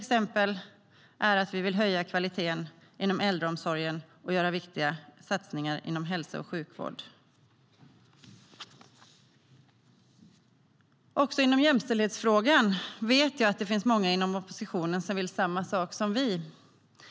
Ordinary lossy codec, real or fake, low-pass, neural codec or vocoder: none; real; none; none